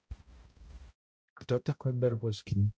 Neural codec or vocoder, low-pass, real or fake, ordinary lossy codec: codec, 16 kHz, 0.5 kbps, X-Codec, HuBERT features, trained on balanced general audio; none; fake; none